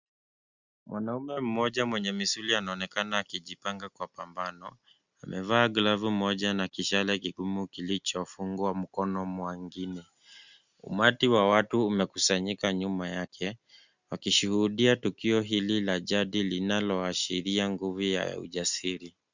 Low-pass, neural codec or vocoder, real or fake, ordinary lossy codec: 7.2 kHz; none; real; Opus, 64 kbps